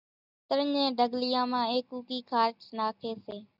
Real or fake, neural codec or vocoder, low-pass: real; none; 5.4 kHz